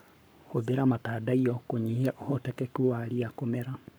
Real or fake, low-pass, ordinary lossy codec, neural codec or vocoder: fake; none; none; codec, 44.1 kHz, 7.8 kbps, Pupu-Codec